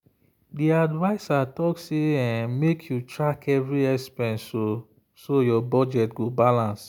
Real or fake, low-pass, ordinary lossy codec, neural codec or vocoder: real; none; none; none